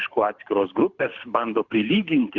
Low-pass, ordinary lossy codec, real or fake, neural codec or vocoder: 7.2 kHz; Opus, 64 kbps; fake; codec, 24 kHz, 6 kbps, HILCodec